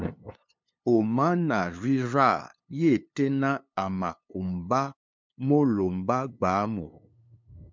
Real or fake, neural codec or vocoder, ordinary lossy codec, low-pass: fake; codec, 16 kHz, 2 kbps, FunCodec, trained on LibriTTS, 25 frames a second; MP3, 64 kbps; 7.2 kHz